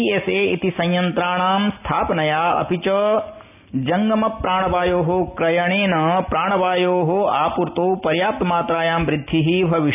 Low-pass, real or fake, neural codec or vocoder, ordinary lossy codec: 3.6 kHz; real; none; none